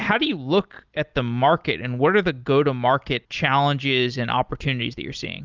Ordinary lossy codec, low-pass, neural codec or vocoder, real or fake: Opus, 32 kbps; 7.2 kHz; none; real